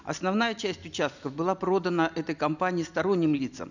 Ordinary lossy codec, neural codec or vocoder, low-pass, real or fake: none; none; 7.2 kHz; real